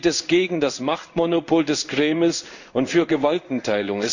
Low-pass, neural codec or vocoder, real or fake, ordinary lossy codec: 7.2 kHz; codec, 16 kHz in and 24 kHz out, 1 kbps, XY-Tokenizer; fake; none